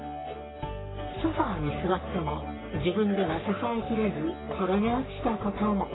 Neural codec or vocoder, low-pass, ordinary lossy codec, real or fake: codec, 44.1 kHz, 3.4 kbps, Pupu-Codec; 7.2 kHz; AAC, 16 kbps; fake